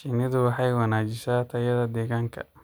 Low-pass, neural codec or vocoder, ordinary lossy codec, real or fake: none; none; none; real